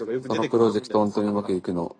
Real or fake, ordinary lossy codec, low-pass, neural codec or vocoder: real; AAC, 32 kbps; 9.9 kHz; none